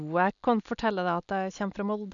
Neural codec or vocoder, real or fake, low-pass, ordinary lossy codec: none; real; 7.2 kHz; none